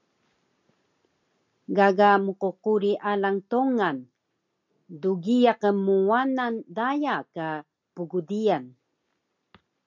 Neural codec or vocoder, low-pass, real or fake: none; 7.2 kHz; real